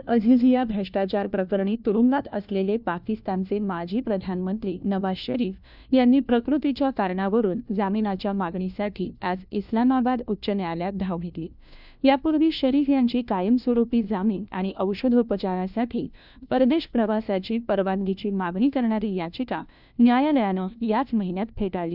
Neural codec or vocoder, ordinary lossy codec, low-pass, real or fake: codec, 16 kHz, 1 kbps, FunCodec, trained on LibriTTS, 50 frames a second; none; 5.4 kHz; fake